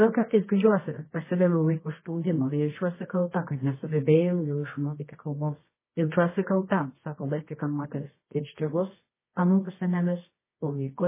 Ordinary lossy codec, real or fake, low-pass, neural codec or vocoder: MP3, 16 kbps; fake; 3.6 kHz; codec, 24 kHz, 0.9 kbps, WavTokenizer, medium music audio release